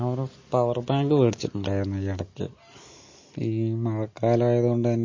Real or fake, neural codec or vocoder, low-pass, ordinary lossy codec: real; none; 7.2 kHz; MP3, 32 kbps